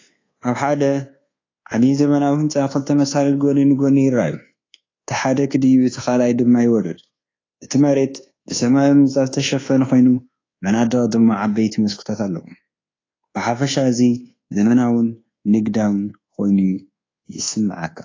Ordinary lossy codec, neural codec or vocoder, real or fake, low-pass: AAC, 32 kbps; codec, 24 kHz, 1.2 kbps, DualCodec; fake; 7.2 kHz